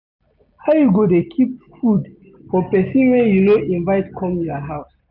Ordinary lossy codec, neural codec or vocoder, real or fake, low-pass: none; none; real; 5.4 kHz